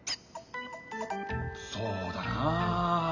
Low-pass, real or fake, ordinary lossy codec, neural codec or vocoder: 7.2 kHz; real; none; none